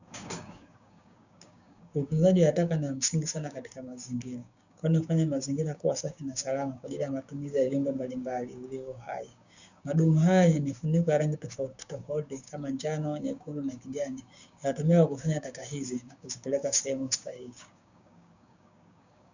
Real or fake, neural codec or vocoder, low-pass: fake; codec, 16 kHz, 6 kbps, DAC; 7.2 kHz